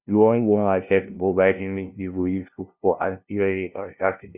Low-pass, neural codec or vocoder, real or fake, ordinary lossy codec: 3.6 kHz; codec, 16 kHz, 0.5 kbps, FunCodec, trained on LibriTTS, 25 frames a second; fake; none